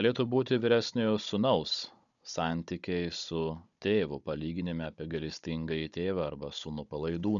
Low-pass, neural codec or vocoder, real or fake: 7.2 kHz; codec, 16 kHz, 16 kbps, FunCodec, trained on Chinese and English, 50 frames a second; fake